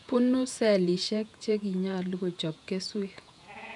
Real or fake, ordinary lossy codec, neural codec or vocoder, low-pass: fake; none; vocoder, 48 kHz, 128 mel bands, Vocos; 10.8 kHz